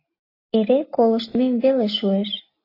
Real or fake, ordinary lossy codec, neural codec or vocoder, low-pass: real; AAC, 32 kbps; none; 5.4 kHz